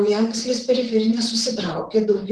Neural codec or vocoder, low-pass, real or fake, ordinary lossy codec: vocoder, 44.1 kHz, 128 mel bands, Pupu-Vocoder; 10.8 kHz; fake; Opus, 16 kbps